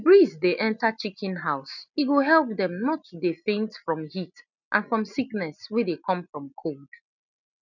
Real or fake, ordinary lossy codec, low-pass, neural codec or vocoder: real; none; 7.2 kHz; none